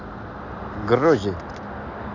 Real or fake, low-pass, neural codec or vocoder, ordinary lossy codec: real; 7.2 kHz; none; none